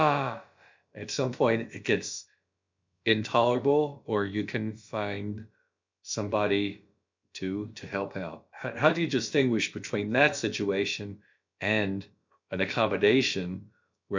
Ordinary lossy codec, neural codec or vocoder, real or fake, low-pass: MP3, 64 kbps; codec, 16 kHz, about 1 kbps, DyCAST, with the encoder's durations; fake; 7.2 kHz